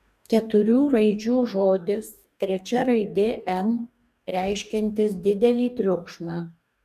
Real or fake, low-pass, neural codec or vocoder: fake; 14.4 kHz; codec, 44.1 kHz, 2.6 kbps, DAC